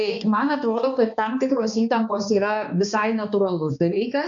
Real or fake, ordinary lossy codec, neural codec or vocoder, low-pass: fake; MP3, 64 kbps; codec, 16 kHz, 2 kbps, X-Codec, HuBERT features, trained on balanced general audio; 7.2 kHz